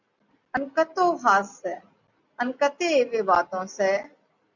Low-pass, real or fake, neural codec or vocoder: 7.2 kHz; real; none